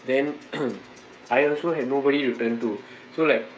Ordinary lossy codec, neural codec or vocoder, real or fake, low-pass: none; codec, 16 kHz, 16 kbps, FreqCodec, smaller model; fake; none